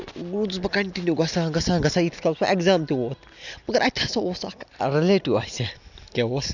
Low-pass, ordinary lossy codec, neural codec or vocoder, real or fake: 7.2 kHz; none; none; real